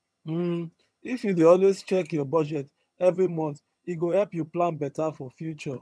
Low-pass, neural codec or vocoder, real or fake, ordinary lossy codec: none; vocoder, 22.05 kHz, 80 mel bands, HiFi-GAN; fake; none